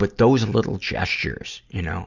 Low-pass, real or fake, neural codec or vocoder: 7.2 kHz; real; none